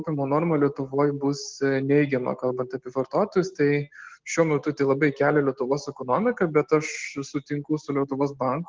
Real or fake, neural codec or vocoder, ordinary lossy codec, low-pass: real; none; Opus, 16 kbps; 7.2 kHz